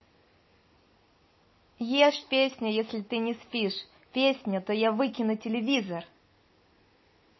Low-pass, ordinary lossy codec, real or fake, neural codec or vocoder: 7.2 kHz; MP3, 24 kbps; real; none